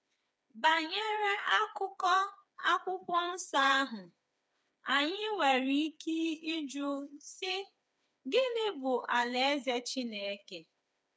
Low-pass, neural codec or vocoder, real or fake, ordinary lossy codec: none; codec, 16 kHz, 4 kbps, FreqCodec, smaller model; fake; none